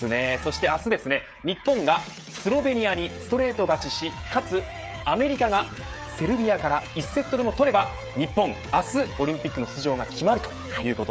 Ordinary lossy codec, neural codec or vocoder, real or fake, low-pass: none; codec, 16 kHz, 16 kbps, FreqCodec, smaller model; fake; none